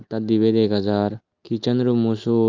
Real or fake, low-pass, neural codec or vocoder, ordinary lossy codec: real; 7.2 kHz; none; Opus, 32 kbps